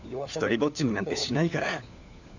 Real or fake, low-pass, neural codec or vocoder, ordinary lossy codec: fake; 7.2 kHz; codec, 16 kHz, 4 kbps, FunCodec, trained on LibriTTS, 50 frames a second; none